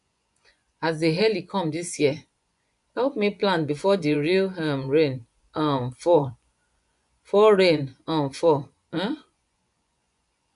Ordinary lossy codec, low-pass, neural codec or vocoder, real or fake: none; 10.8 kHz; vocoder, 24 kHz, 100 mel bands, Vocos; fake